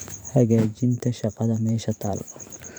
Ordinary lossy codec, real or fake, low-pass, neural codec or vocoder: none; fake; none; vocoder, 44.1 kHz, 128 mel bands every 512 samples, BigVGAN v2